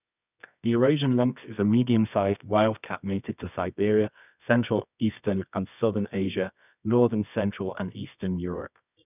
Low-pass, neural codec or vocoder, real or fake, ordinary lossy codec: 3.6 kHz; codec, 24 kHz, 0.9 kbps, WavTokenizer, medium music audio release; fake; none